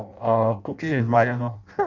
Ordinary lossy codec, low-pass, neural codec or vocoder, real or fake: none; 7.2 kHz; codec, 16 kHz in and 24 kHz out, 0.6 kbps, FireRedTTS-2 codec; fake